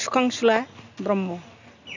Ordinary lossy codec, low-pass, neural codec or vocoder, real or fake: none; 7.2 kHz; none; real